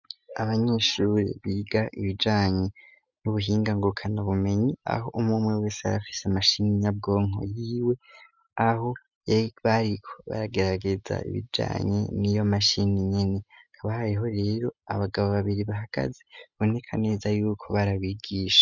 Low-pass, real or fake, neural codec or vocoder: 7.2 kHz; real; none